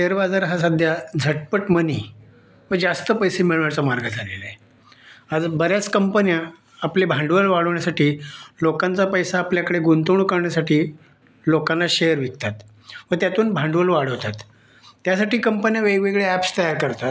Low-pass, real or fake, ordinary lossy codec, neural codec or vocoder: none; real; none; none